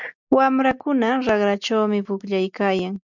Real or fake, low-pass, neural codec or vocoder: real; 7.2 kHz; none